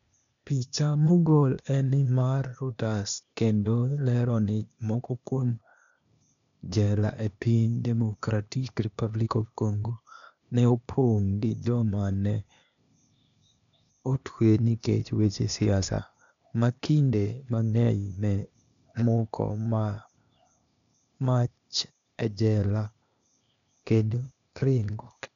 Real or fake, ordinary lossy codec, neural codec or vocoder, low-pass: fake; none; codec, 16 kHz, 0.8 kbps, ZipCodec; 7.2 kHz